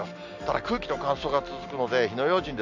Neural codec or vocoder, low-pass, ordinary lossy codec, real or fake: none; 7.2 kHz; MP3, 64 kbps; real